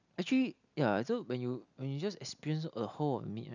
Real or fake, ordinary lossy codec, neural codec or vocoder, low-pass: real; none; none; 7.2 kHz